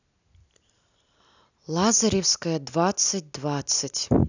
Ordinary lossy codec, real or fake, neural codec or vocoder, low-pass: none; real; none; 7.2 kHz